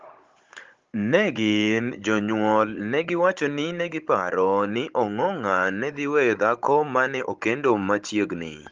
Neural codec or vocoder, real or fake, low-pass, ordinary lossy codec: codec, 16 kHz, 16 kbps, FunCodec, trained on Chinese and English, 50 frames a second; fake; 7.2 kHz; Opus, 32 kbps